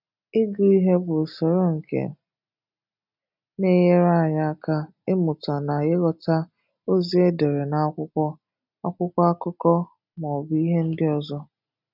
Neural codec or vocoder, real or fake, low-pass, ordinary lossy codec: none; real; 5.4 kHz; none